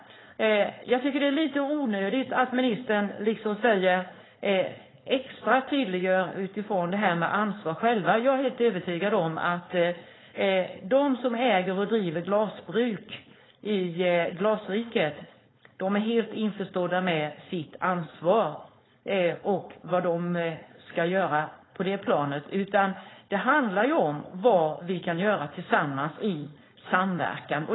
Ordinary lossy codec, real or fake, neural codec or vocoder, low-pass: AAC, 16 kbps; fake; codec, 16 kHz, 4.8 kbps, FACodec; 7.2 kHz